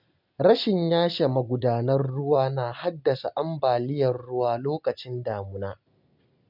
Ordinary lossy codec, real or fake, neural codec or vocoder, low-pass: none; real; none; 5.4 kHz